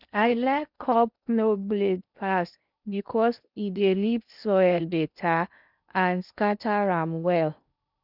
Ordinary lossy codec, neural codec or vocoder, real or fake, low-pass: none; codec, 16 kHz in and 24 kHz out, 0.8 kbps, FocalCodec, streaming, 65536 codes; fake; 5.4 kHz